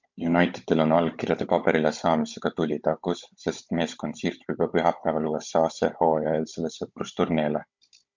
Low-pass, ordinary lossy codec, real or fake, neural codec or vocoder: 7.2 kHz; MP3, 64 kbps; fake; codec, 16 kHz, 16 kbps, FunCodec, trained on Chinese and English, 50 frames a second